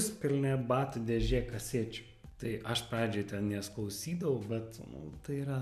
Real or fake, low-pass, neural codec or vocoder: real; 14.4 kHz; none